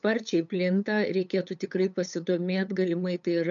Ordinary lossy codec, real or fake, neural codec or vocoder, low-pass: MP3, 96 kbps; fake; codec, 16 kHz, 8 kbps, FunCodec, trained on LibriTTS, 25 frames a second; 7.2 kHz